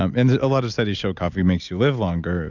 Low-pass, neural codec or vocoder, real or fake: 7.2 kHz; none; real